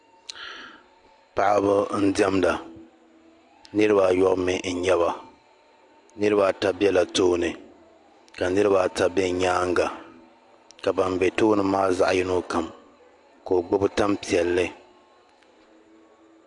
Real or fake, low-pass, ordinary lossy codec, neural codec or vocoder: real; 10.8 kHz; AAC, 64 kbps; none